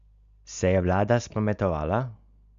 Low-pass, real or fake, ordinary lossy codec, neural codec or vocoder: 7.2 kHz; real; Opus, 64 kbps; none